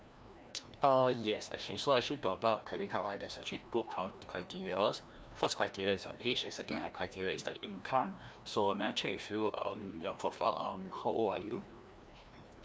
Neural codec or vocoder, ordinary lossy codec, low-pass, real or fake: codec, 16 kHz, 1 kbps, FreqCodec, larger model; none; none; fake